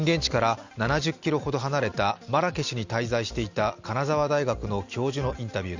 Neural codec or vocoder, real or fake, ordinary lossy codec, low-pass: none; real; Opus, 64 kbps; 7.2 kHz